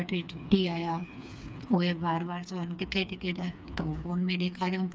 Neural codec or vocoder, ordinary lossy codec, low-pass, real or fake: codec, 16 kHz, 4 kbps, FreqCodec, smaller model; none; none; fake